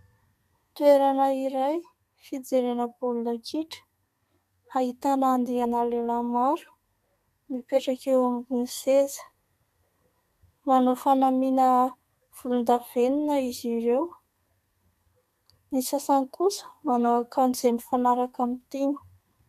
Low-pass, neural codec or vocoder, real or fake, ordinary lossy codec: 14.4 kHz; codec, 32 kHz, 1.9 kbps, SNAC; fake; MP3, 96 kbps